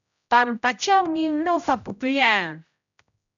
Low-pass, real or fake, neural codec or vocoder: 7.2 kHz; fake; codec, 16 kHz, 0.5 kbps, X-Codec, HuBERT features, trained on general audio